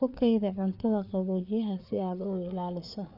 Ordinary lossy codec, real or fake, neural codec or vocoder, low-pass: none; fake; codec, 16 kHz, 4 kbps, FreqCodec, larger model; 5.4 kHz